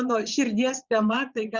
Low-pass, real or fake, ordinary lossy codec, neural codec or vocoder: 7.2 kHz; fake; Opus, 64 kbps; vocoder, 22.05 kHz, 80 mel bands, Vocos